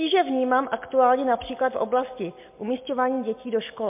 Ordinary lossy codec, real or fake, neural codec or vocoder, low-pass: MP3, 32 kbps; real; none; 3.6 kHz